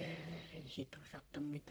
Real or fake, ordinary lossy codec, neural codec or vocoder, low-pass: fake; none; codec, 44.1 kHz, 1.7 kbps, Pupu-Codec; none